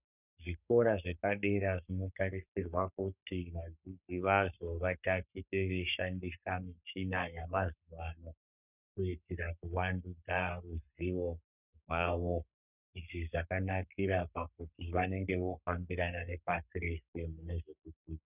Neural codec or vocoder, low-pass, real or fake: codec, 44.1 kHz, 3.4 kbps, Pupu-Codec; 3.6 kHz; fake